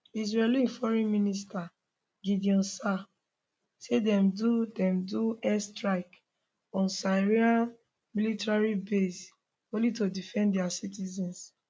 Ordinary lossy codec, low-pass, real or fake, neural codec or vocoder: none; none; real; none